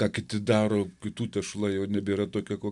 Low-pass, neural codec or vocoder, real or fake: 10.8 kHz; none; real